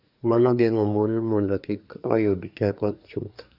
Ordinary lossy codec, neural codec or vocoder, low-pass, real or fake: none; codec, 24 kHz, 1 kbps, SNAC; 5.4 kHz; fake